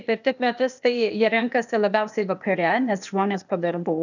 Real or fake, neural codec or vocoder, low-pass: fake; codec, 16 kHz, 0.8 kbps, ZipCodec; 7.2 kHz